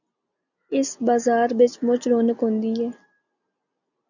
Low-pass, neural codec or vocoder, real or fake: 7.2 kHz; none; real